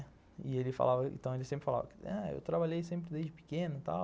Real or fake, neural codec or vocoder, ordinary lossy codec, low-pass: real; none; none; none